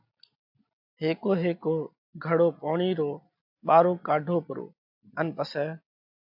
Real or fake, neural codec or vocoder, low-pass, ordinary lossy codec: real; none; 5.4 kHz; AAC, 48 kbps